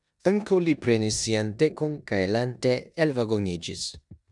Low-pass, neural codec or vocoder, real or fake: 10.8 kHz; codec, 16 kHz in and 24 kHz out, 0.9 kbps, LongCat-Audio-Codec, four codebook decoder; fake